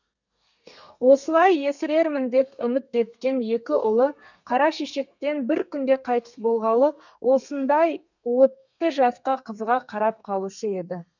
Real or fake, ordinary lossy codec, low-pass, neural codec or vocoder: fake; none; 7.2 kHz; codec, 32 kHz, 1.9 kbps, SNAC